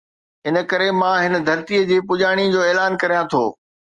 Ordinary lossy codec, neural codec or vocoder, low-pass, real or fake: Opus, 32 kbps; none; 10.8 kHz; real